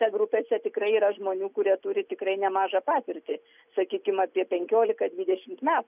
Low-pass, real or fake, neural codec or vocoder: 3.6 kHz; real; none